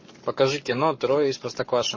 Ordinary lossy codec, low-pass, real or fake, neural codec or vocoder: MP3, 32 kbps; 7.2 kHz; fake; codec, 44.1 kHz, 7.8 kbps, Pupu-Codec